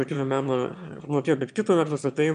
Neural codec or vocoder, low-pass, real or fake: autoencoder, 22.05 kHz, a latent of 192 numbers a frame, VITS, trained on one speaker; 9.9 kHz; fake